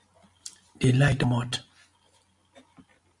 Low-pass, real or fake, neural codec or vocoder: 10.8 kHz; real; none